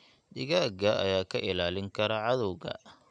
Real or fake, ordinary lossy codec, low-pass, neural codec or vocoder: real; none; 9.9 kHz; none